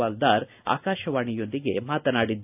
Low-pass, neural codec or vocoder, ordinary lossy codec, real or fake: 3.6 kHz; none; AAC, 32 kbps; real